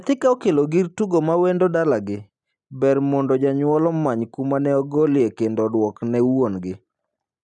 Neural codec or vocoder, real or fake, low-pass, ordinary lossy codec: none; real; 10.8 kHz; none